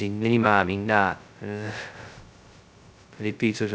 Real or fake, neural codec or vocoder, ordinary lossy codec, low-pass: fake; codec, 16 kHz, 0.2 kbps, FocalCodec; none; none